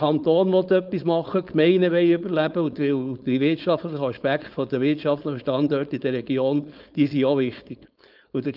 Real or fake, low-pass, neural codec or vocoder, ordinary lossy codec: fake; 5.4 kHz; codec, 16 kHz, 4.8 kbps, FACodec; Opus, 24 kbps